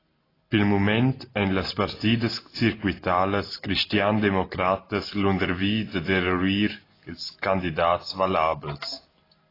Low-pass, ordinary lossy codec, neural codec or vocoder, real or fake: 5.4 kHz; AAC, 24 kbps; none; real